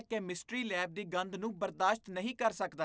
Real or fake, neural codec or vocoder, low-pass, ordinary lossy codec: real; none; none; none